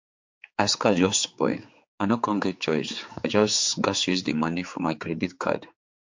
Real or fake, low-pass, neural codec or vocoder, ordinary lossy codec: fake; 7.2 kHz; codec, 16 kHz, 4 kbps, X-Codec, HuBERT features, trained on general audio; MP3, 48 kbps